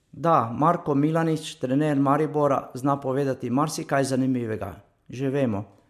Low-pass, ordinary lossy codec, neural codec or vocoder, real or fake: 14.4 kHz; MP3, 64 kbps; none; real